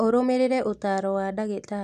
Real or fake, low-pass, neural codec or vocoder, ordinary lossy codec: real; 14.4 kHz; none; none